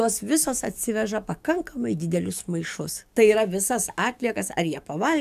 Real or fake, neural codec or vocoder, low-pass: fake; codec, 44.1 kHz, 7.8 kbps, DAC; 14.4 kHz